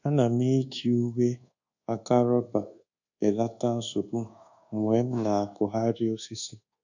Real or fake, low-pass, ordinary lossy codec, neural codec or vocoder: fake; 7.2 kHz; none; codec, 24 kHz, 1.2 kbps, DualCodec